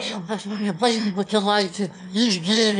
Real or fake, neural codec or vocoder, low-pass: fake; autoencoder, 22.05 kHz, a latent of 192 numbers a frame, VITS, trained on one speaker; 9.9 kHz